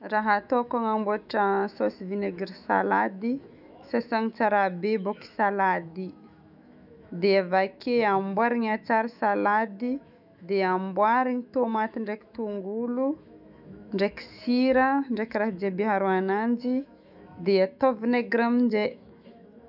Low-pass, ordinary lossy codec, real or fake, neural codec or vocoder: 5.4 kHz; none; fake; autoencoder, 48 kHz, 128 numbers a frame, DAC-VAE, trained on Japanese speech